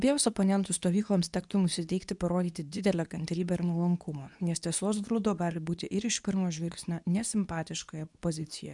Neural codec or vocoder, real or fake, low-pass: codec, 24 kHz, 0.9 kbps, WavTokenizer, medium speech release version 2; fake; 10.8 kHz